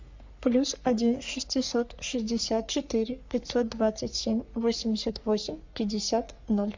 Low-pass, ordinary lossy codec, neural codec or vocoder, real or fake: 7.2 kHz; MP3, 64 kbps; codec, 44.1 kHz, 3.4 kbps, Pupu-Codec; fake